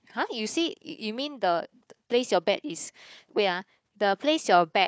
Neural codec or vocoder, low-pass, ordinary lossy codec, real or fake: codec, 16 kHz, 4 kbps, FunCodec, trained on Chinese and English, 50 frames a second; none; none; fake